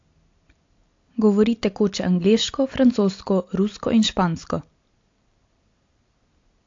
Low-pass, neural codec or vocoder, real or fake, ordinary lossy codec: 7.2 kHz; none; real; AAC, 48 kbps